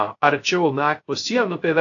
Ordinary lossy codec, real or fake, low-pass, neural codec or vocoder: AAC, 48 kbps; fake; 7.2 kHz; codec, 16 kHz, 0.3 kbps, FocalCodec